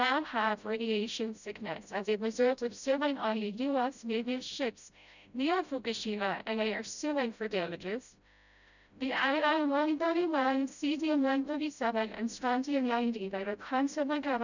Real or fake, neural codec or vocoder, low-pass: fake; codec, 16 kHz, 0.5 kbps, FreqCodec, smaller model; 7.2 kHz